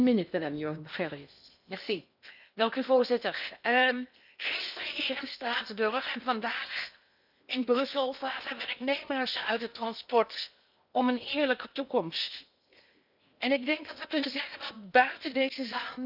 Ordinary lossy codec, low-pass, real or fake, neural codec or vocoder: none; 5.4 kHz; fake; codec, 16 kHz in and 24 kHz out, 0.8 kbps, FocalCodec, streaming, 65536 codes